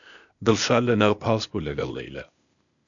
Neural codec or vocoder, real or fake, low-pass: codec, 16 kHz, 0.8 kbps, ZipCodec; fake; 7.2 kHz